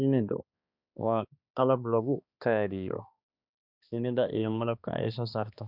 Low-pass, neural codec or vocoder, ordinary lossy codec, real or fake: 5.4 kHz; codec, 16 kHz, 2 kbps, X-Codec, HuBERT features, trained on balanced general audio; none; fake